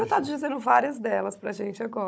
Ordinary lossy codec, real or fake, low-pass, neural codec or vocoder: none; fake; none; codec, 16 kHz, 16 kbps, FunCodec, trained on Chinese and English, 50 frames a second